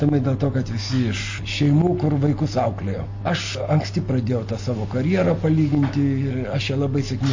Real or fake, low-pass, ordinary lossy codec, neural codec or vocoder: real; 7.2 kHz; MP3, 32 kbps; none